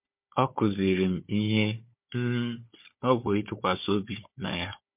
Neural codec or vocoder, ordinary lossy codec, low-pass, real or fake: codec, 16 kHz, 4 kbps, FunCodec, trained on Chinese and English, 50 frames a second; MP3, 32 kbps; 3.6 kHz; fake